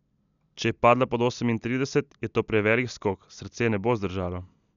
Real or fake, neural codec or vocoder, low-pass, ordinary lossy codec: real; none; 7.2 kHz; none